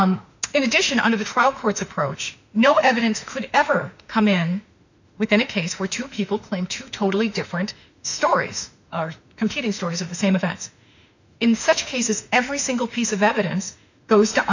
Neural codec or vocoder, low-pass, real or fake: autoencoder, 48 kHz, 32 numbers a frame, DAC-VAE, trained on Japanese speech; 7.2 kHz; fake